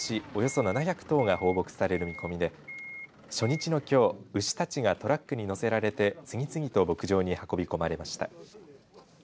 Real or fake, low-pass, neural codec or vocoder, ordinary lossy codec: real; none; none; none